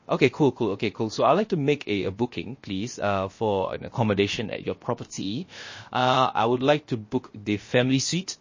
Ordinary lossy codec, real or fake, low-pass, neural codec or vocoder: MP3, 32 kbps; fake; 7.2 kHz; codec, 16 kHz, 0.7 kbps, FocalCodec